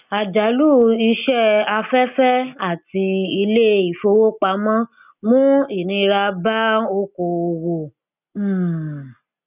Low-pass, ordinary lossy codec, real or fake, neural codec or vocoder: 3.6 kHz; none; real; none